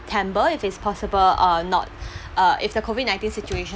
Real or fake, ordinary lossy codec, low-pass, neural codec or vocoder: real; none; none; none